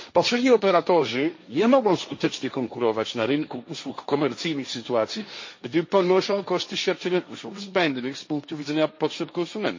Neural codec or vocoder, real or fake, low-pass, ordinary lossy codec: codec, 16 kHz, 1.1 kbps, Voila-Tokenizer; fake; 7.2 kHz; MP3, 32 kbps